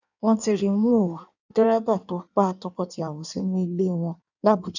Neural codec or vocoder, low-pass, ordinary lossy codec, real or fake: codec, 16 kHz in and 24 kHz out, 1.1 kbps, FireRedTTS-2 codec; 7.2 kHz; none; fake